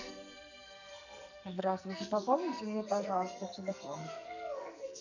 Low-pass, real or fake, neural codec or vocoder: 7.2 kHz; fake; codec, 44.1 kHz, 2.6 kbps, SNAC